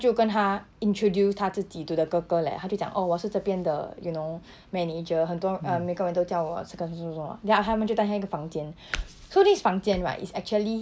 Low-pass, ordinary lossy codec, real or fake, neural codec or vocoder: none; none; real; none